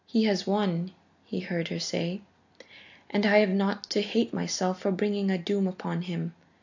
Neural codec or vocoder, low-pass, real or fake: none; 7.2 kHz; real